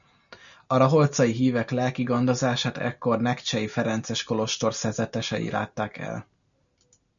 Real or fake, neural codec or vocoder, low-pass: real; none; 7.2 kHz